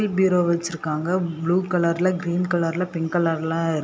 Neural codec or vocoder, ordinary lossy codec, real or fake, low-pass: none; none; real; none